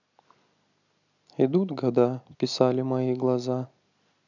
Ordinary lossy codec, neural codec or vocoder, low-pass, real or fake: none; none; 7.2 kHz; real